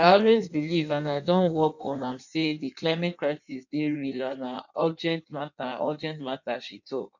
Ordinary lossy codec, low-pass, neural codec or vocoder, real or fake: none; 7.2 kHz; codec, 16 kHz in and 24 kHz out, 1.1 kbps, FireRedTTS-2 codec; fake